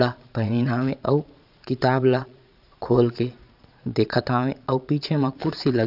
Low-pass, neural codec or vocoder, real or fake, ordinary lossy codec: 5.4 kHz; vocoder, 22.05 kHz, 80 mel bands, Vocos; fake; none